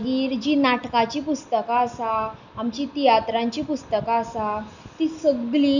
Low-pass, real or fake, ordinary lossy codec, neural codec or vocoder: 7.2 kHz; real; none; none